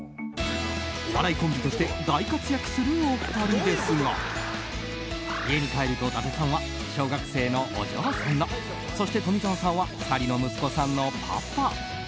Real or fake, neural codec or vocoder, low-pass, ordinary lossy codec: real; none; none; none